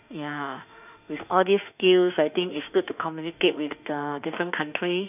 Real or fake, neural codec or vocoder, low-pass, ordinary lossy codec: fake; autoencoder, 48 kHz, 32 numbers a frame, DAC-VAE, trained on Japanese speech; 3.6 kHz; none